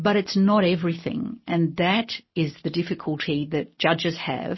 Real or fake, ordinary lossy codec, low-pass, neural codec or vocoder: real; MP3, 24 kbps; 7.2 kHz; none